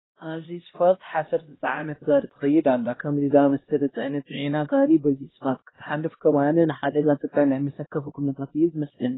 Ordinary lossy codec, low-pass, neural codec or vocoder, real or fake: AAC, 16 kbps; 7.2 kHz; codec, 16 kHz, 1 kbps, X-Codec, HuBERT features, trained on LibriSpeech; fake